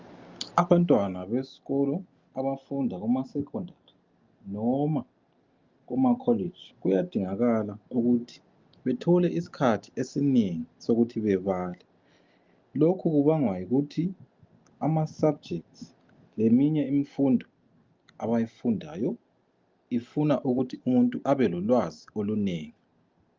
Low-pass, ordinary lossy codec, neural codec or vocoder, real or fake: 7.2 kHz; Opus, 24 kbps; none; real